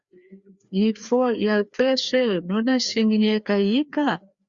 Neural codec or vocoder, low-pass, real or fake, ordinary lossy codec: codec, 16 kHz, 2 kbps, FreqCodec, larger model; 7.2 kHz; fake; Opus, 64 kbps